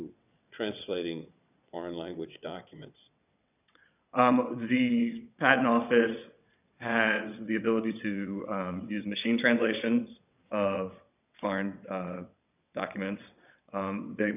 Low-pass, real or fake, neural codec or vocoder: 3.6 kHz; fake; vocoder, 22.05 kHz, 80 mel bands, WaveNeXt